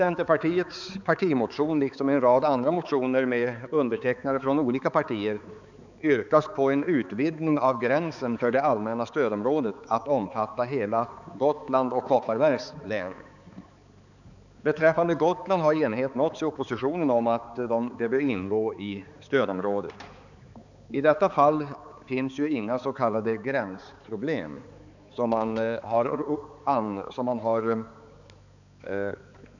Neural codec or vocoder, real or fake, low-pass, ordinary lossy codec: codec, 16 kHz, 4 kbps, X-Codec, HuBERT features, trained on balanced general audio; fake; 7.2 kHz; none